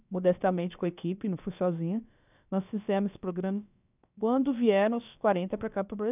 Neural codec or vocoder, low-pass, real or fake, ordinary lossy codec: codec, 16 kHz, about 1 kbps, DyCAST, with the encoder's durations; 3.6 kHz; fake; none